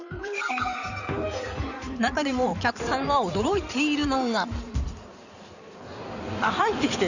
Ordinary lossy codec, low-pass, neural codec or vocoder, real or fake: none; 7.2 kHz; codec, 16 kHz in and 24 kHz out, 2.2 kbps, FireRedTTS-2 codec; fake